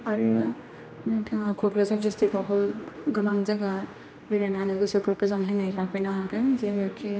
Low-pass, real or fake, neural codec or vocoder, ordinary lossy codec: none; fake; codec, 16 kHz, 1 kbps, X-Codec, HuBERT features, trained on general audio; none